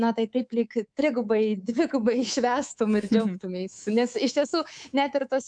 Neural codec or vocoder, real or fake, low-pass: codec, 24 kHz, 3.1 kbps, DualCodec; fake; 9.9 kHz